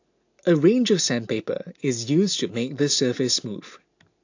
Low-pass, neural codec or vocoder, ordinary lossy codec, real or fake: 7.2 kHz; none; AAC, 48 kbps; real